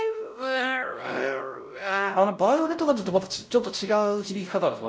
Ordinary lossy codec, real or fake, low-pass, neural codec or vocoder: none; fake; none; codec, 16 kHz, 0.5 kbps, X-Codec, WavLM features, trained on Multilingual LibriSpeech